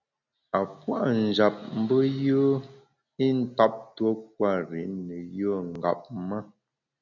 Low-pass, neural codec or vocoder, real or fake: 7.2 kHz; none; real